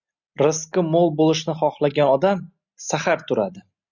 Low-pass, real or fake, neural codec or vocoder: 7.2 kHz; real; none